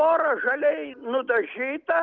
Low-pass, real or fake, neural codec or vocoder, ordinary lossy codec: 7.2 kHz; real; none; Opus, 24 kbps